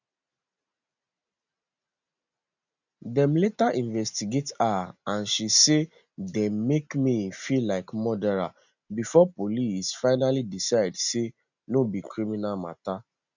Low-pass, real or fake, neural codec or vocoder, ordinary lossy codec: 7.2 kHz; real; none; none